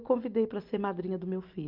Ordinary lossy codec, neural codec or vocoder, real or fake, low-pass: Opus, 32 kbps; none; real; 5.4 kHz